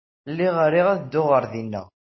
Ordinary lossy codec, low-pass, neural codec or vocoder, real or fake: MP3, 24 kbps; 7.2 kHz; none; real